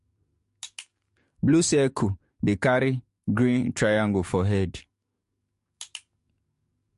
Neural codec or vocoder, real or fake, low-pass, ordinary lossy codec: codec, 44.1 kHz, 7.8 kbps, DAC; fake; 14.4 kHz; MP3, 48 kbps